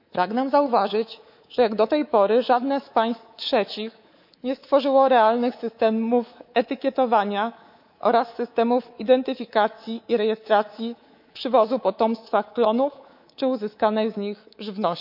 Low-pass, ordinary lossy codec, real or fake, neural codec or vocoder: 5.4 kHz; none; fake; codec, 24 kHz, 3.1 kbps, DualCodec